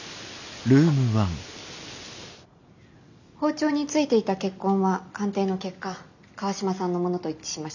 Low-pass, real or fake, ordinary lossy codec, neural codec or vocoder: 7.2 kHz; real; none; none